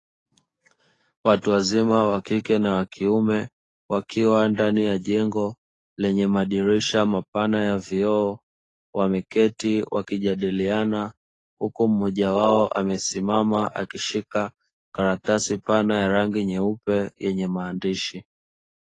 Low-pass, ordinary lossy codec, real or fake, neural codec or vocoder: 10.8 kHz; AAC, 32 kbps; fake; codec, 44.1 kHz, 7.8 kbps, DAC